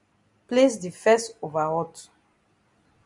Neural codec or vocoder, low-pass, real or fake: none; 10.8 kHz; real